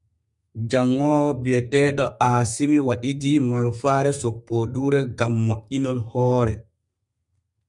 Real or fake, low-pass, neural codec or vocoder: fake; 10.8 kHz; codec, 32 kHz, 1.9 kbps, SNAC